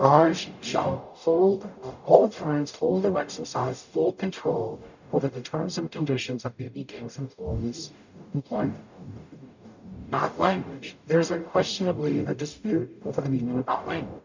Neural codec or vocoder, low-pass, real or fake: codec, 44.1 kHz, 0.9 kbps, DAC; 7.2 kHz; fake